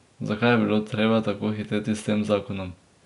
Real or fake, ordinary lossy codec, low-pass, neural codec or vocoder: real; none; 10.8 kHz; none